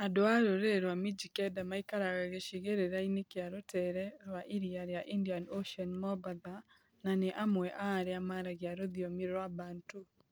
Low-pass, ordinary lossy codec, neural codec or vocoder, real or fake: none; none; none; real